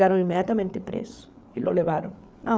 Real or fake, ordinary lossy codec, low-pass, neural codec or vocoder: fake; none; none; codec, 16 kHz, 8 kbps, FreqCodec, larger model